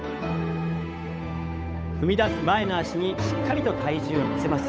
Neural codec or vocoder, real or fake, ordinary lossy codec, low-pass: codec, 16 kHz, 8 kbps, FunCodec, trained on Chinese and English, 25 frames a second; fake; none; none